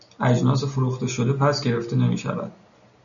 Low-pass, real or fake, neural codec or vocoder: 7.2 kHz; real; none